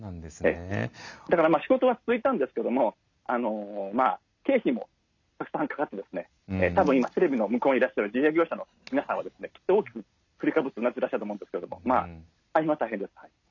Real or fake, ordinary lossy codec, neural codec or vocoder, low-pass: real; none; none; 7.2 kHz